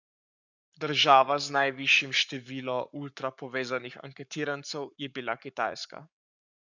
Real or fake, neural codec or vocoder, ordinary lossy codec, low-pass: fake; codec, 44.1 kHz, 7.8 kbps, Pupu-Codec; none; 7.2 kHz